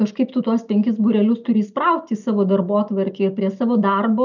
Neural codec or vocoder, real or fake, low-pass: none; real; 7.2 kHz